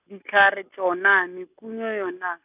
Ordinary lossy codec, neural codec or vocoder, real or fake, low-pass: none; none; real; 3.6 kHz